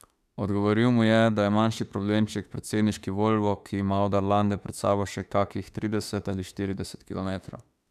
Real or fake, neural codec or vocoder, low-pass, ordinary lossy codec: fake; autoencoder, 48 kHz, 32 numbers a frame, DAC-VAE, trained on Japanese speech; 14.4 kHz; none